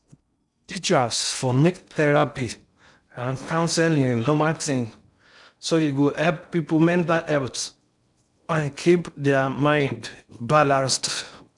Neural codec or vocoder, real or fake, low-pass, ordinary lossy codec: codec, 16 kHz in and 24 kHz out, 0.6 kbps, FocalCodec, streaming, 4096 codes; fake; 10.8 kHz; none